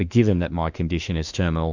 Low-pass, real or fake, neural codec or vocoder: 7.2 kHz; fake; autoencoder, 48 kHz, 32 numbers a frame, DAC-VAE, trained on Japanese speech